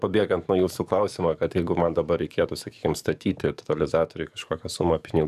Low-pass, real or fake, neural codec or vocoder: 14.4 kHz; fake; codec, 44.1 kHz, 7.8 kbps, DAC